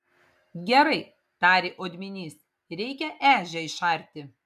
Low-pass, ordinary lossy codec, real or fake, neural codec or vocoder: 14.4 kHz; MP3, 96 kbps; real; none